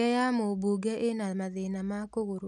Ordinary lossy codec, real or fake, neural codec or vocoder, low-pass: none; real; none; none